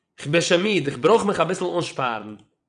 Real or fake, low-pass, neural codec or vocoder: fake; 9.9 kHz; vocoder, 22.05 kHz, 80 mel bands, WaveNeXt